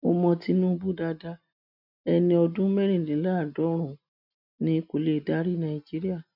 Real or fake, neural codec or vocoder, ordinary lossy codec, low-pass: real; none; none; 5.4 kHz